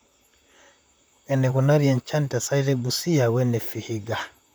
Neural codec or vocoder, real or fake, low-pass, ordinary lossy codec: vocoder, 44.1 kHz, 128 mel bands, Pupu-Vocoder; fake; none; none